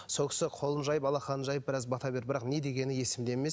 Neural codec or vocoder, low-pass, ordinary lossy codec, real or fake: none; none; none; real